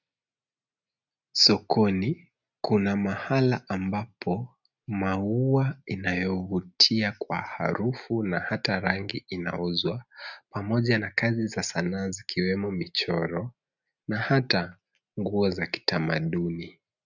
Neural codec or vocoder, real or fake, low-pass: none; real; 7.2 kHz